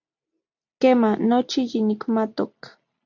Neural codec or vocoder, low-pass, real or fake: none; 7.2 kHz; real